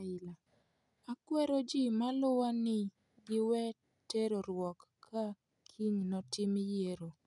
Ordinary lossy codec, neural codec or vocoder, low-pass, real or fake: none; none; 10.8 kHz; real